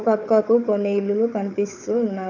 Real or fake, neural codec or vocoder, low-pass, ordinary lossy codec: fake; codec, 16 kHz, 4 kbps, FunCodec, trained on Chinese and English, 50 frames a second; 7.2 kHz; none